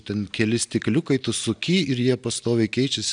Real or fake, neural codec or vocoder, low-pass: real; none; 9.9 kHz